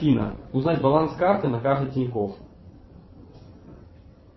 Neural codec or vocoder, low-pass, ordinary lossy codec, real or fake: vocoder, 22.05 kHz, 80 mel bands, WaveNeXt; 7.2 kHz; MP3, 24 kbps; fake